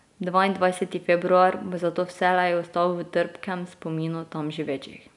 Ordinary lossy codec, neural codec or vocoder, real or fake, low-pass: none; none; real; 10.8 kHz